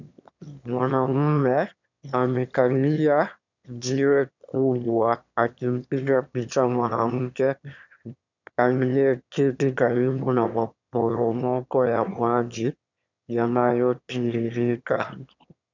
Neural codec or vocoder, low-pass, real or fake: autoencoder, 22.05 kHz, a latent of 192 numbers a frame, VITS, trained on one speaker; 7.2 kHz; fake